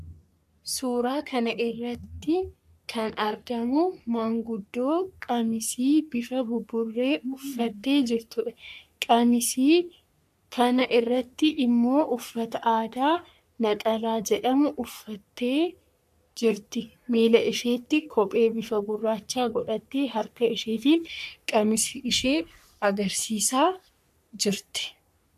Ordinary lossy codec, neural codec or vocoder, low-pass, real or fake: AAC, 96 kbps; codec, 44.1 kHz, 3.4 kbps, Pupu-Codec; 14.4 kHz; fake